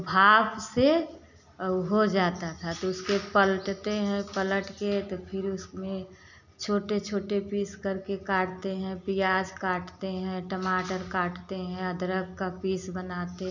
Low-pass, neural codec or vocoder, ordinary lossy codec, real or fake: 7.2 kHz; none; none; real